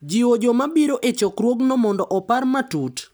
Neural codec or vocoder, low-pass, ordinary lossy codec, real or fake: none; none; none; real